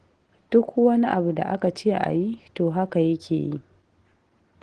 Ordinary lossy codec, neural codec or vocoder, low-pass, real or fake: Opus, 16 kbps; none; 9.9 kHz; real